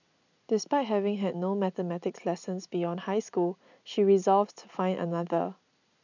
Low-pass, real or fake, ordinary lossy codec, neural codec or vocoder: 7.2 kHz; real; none; none